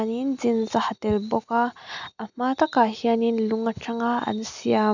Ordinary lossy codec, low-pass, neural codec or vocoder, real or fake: none; 7.2 kHz; none; real